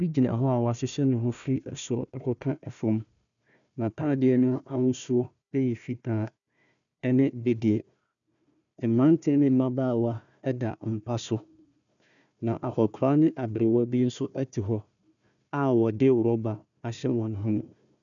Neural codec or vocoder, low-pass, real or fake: codec, 16 kHz, 1 kbps, FunCodec, trained on Chinese and English, 50 frames a second; 7.2 kHz; fake